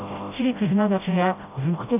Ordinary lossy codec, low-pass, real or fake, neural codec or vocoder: none; 3.6 kHz; fake; codec, 16 kHz, 0.5 kbps, FreqCodec, smaller model